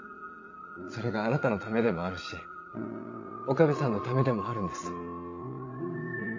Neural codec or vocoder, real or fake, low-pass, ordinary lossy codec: vocoder, 22.05 kHz, 80 mel bands, Vocos; fake; 7.2 kHz; MP3, 48 kbps